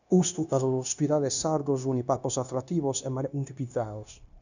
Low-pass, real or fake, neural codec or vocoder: 7.2 kHz; fake; codec, 16 kHz, 0.9 kbps, LongCat-Audio-Codec